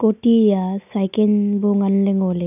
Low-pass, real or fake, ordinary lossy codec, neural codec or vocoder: 3.6 kHz; real; none; none